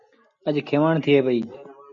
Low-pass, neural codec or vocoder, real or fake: 7.2 kHz; none; real